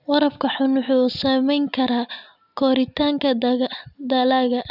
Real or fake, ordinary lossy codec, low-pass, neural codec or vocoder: real; none; 5.4 kHz; none